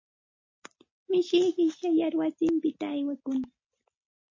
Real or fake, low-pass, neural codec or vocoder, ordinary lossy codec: real; 7.2 kHz; none; MP3, 32 kbps